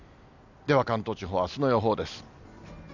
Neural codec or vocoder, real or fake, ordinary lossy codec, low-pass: none; real; none; 7.2 kHz